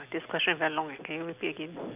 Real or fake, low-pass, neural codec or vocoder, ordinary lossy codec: real; 3.6 kHz; none; none